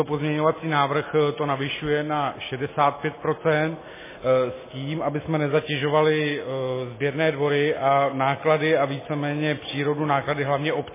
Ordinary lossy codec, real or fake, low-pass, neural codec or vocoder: MP3, 16 kbps; real; 3.6 kHz; none